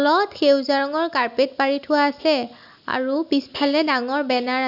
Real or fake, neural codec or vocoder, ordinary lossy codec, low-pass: real; none; none; 5.4 kHz